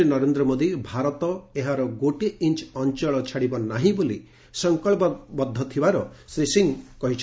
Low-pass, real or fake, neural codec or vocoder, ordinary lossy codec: none; real; none; none